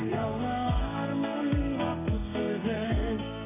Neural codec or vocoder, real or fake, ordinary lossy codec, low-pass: codec, 32 kHz, 1.9 kbps, SNAC; fake; AAC, 24 kbps; 3.6 kHz